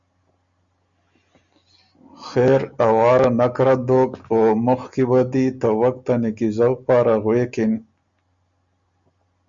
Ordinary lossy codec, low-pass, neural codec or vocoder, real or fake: Opus, 64 kbps; 7.2 kHz; none; real